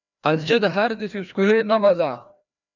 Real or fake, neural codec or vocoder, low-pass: fake; codec, 16 kHz, 1 kbps, FreqCodec, larger model; 7.2 kHz